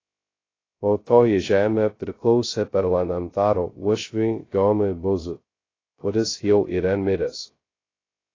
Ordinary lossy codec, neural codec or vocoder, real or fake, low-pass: AAC, 32 kbps; codec, 16 kHz, 0.2 kbps, FocalCodec; fake; 7.2 kHz